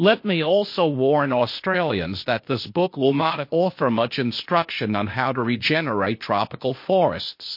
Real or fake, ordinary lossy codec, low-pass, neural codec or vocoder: fake; MP3, 32 kbps; 5.4 kHz; codec, 16 kHz, 0.8 kbps, ZipCodec